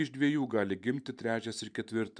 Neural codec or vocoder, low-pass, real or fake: vocoder, 44.1 kHz, 128 mel bands every 512 samples, BigVGAN v2; 9.9 kHz; fake